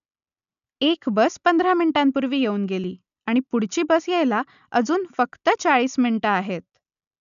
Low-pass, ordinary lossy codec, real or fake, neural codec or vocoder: 7.2 kHz; none; real; none